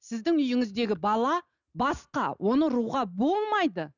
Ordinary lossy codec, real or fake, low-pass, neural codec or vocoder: none; real; 7.2 kHz; none